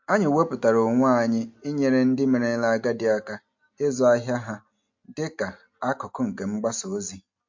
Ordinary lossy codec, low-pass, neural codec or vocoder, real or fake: MP3, 48 kbps; 7.2 kHz; none; real